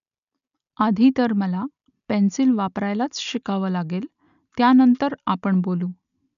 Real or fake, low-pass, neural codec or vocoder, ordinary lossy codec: real; 7.2 kHz; none; none